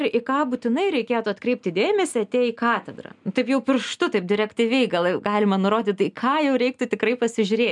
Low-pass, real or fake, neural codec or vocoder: 10.8 kHz; real; none